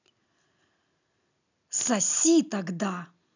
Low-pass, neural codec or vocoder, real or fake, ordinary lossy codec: 7.2 kHz; none; real; none